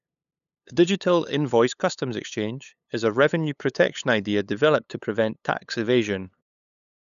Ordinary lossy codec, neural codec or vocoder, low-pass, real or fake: none; codec, 16 kHz, 8 kbps, FunCodec, trained on LibriTTS, 25 frames a second; 7.2 kHz; fake